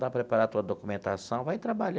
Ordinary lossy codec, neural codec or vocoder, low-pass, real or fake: none; none; none; real